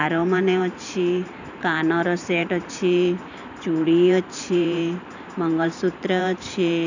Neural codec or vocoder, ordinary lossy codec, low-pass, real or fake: vocoder, 44.1 kHz, 128 mel bands every 512 samples, BigVGAN v2; none; 7.2 kHz; fake